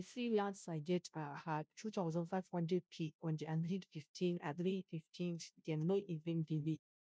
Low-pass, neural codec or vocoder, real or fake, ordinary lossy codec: none; codec, 16 kHz, 0.5 kbps, FunCodec, trained on Chinese and English, 25 frames a second; fake; none